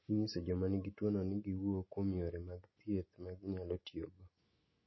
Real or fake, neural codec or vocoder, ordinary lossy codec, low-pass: real; none; MP3, 24 kbps; 7.2 kHz